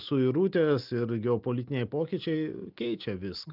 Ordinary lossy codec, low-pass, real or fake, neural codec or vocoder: Opus, 24 kbps; 5.4 kHz; real; none